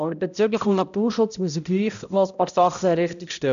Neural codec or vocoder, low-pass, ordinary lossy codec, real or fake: codec, 16 kHz, 0.5 kbps, X-Codec, HuBERT features, trained on balanced general audio; 7.2 kHz; none; fake